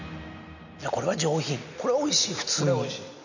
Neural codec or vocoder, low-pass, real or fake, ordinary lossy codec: none; 7.2 kHz; real; none